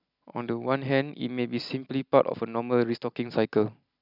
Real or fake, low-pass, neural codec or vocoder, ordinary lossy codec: real; 5.4 kHz; none; none